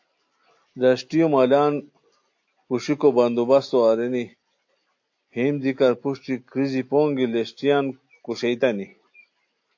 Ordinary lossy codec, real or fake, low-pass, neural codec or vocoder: AAC, 48 kbps; real; 7.2 kHz; none